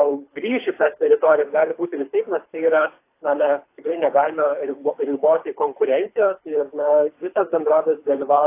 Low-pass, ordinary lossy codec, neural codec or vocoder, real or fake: 3.6 kHz; AAC, 24 kbps; codec, 24 kHz, 3 kbps, HILCodec; fake